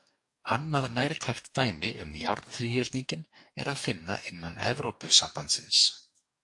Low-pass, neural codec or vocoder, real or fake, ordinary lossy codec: 10.8 kHz; codec, 44.1 kHz, 2.6 kbps, DAC; fake; AAC, 64 kbps